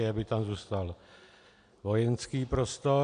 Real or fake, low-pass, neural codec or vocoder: real; 9.9 kHz; none